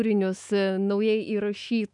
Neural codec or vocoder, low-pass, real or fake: autoencoder, 48 kHz, 128 numbers a frame, DAC-VAE, trained on Japanese speech; 10.8 kHz; fake